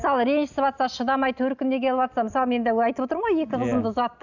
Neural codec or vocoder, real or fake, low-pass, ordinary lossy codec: none; real; 7.2 kHz; none